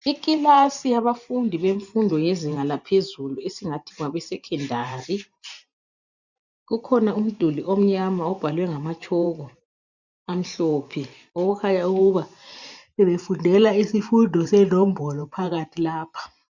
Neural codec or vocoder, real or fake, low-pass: vocoder, 44.1 kHz, 128 mel bands every 512 samples, BigVGAN v2; fake; 7.2 kHz